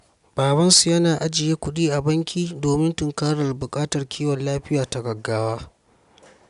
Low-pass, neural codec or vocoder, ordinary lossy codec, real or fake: 10.8 kHz; none; none; real